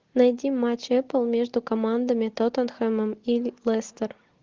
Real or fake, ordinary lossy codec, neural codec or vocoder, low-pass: real; Opus, 16 kbps; none; 7.2 kHz